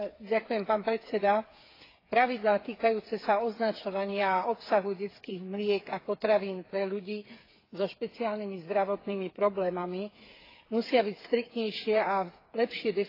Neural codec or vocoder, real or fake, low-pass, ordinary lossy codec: codec, 16 kHz, 8 kbps, FreqCodec, smaller model; fake; 5.4 kHz; AAC, 24 kbps